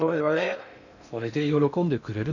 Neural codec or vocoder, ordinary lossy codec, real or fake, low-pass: codec, 16 kHz in and 24 kHz out, 0.6 kbps, FocalCodec, streaming, 2048 codes; none; fake; 7.2 kHz